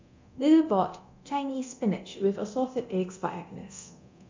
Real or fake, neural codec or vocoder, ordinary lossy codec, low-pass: fake; codec, 24 kHz, 0.9 kbps, DualCodec; MP3, 64 kbps; 7.2 kHz